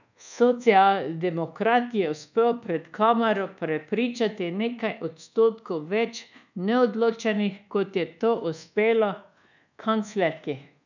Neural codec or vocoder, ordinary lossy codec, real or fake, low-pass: codec, 24 kHz, 1.2 kbps, DualCodec; none; fake; 7.2 kHz